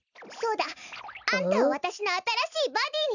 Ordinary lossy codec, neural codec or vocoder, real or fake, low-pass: none; none; real; 7.2 kHz